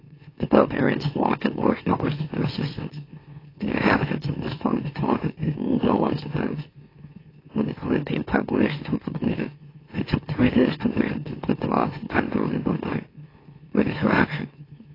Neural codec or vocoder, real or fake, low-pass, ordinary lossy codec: autoencoder, 44.1 kHz, a latent of 192 numbers a frame, MeloTTS; fake; 5.4 kHz; AAC, 24 kbps